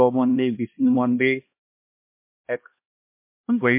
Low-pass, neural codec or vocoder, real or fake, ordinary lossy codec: 3.6 kHz; codec, 16 kHz, 1 kbps, X-Codec, HuBERT features, trained on LibriSpeech; fake; MP3, 24 kbps